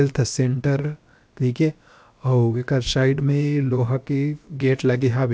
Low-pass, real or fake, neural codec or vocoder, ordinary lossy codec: none; fake; codec, 16 kHz, about 1 kbps, DyCAST, with the encoder's durations; none